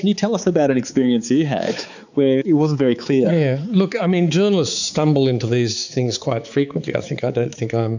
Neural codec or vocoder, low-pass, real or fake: codec, 16 kHz, 4 kbps, X-Codec, HuBERT features, trained on balanced general audio; 7.2 kHz; fake